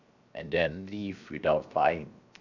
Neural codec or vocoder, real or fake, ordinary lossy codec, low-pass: codec, 16 kHz, 0.7 kbps, FocalCodec; fake; none; 7.2 kHz